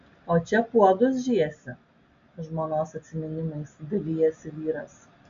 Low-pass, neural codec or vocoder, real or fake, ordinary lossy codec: 7.2 kHz; none; real; AAC, 64 kbps